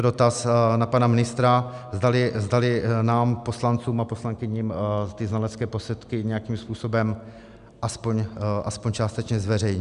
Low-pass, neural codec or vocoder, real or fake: 10.8 kHz; none; real